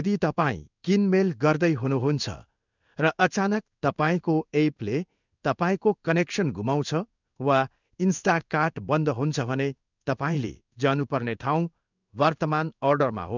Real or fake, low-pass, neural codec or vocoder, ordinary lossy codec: fake; 7.2 kHz; codec, 16 kHz in and 24 kHz out, 1 kbps, XY-Tokenizer; none